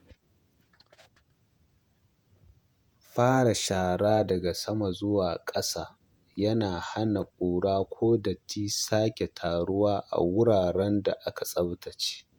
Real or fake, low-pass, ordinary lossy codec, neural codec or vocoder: fake; none; none; vocoder, 48 kHz, 128 mel bands, Vocos